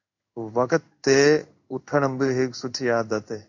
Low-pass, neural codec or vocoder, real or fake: 7.2 kHz; codec, 16 kHz in and 24 kHz out, 1 kbps, XY-Tokenizer; fake